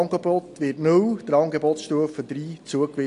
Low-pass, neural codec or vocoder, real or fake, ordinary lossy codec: 10.8 kHz; none; real; AAC, 48 kbps